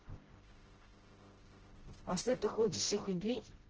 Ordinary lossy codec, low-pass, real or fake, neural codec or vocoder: Opus, 16 kbps; 7.2 kHz; fake; codec, 16 kHz, 0.5 kbps, FreqCodec, smaller model